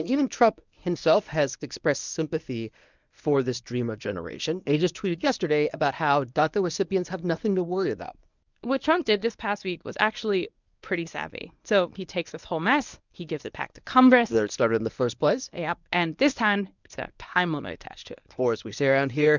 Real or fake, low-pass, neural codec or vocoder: fake; 7.2 kHz; codec, 24 kHz, 0.9 kbps, WavTokenizer, medium speech release version 1